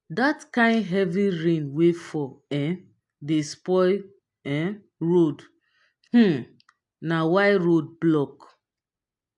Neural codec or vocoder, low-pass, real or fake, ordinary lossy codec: none; 10.8 kHz; real; AAC, 64 kbps